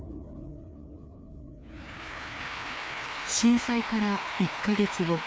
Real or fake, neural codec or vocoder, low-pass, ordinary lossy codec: fake; codec, 16 kHz, 2 kbps, FreqCodec, larger model; none; none